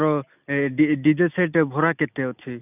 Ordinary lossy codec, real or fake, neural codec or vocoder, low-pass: none; real; none; 3.6 kHz